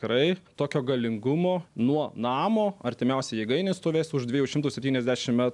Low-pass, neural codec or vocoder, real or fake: 10.8 kHz; none; real